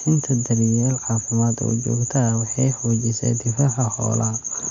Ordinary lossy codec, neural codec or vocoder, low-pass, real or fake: none; none; 7.2 kHz; real